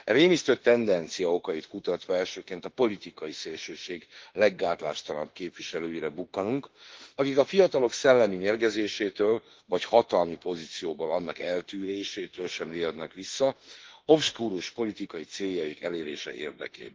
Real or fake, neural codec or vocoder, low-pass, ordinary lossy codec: fake; autoencoder, 48 kHz, 32 numbers a frame, DAC-VAE, trained on Japanese speech; 7.2 kHz; Opus, 16 kbps